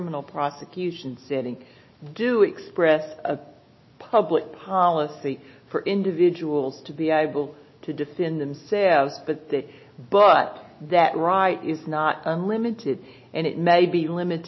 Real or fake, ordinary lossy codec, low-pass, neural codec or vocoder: real; MP3, 24 kbps; 7.2 kHz; none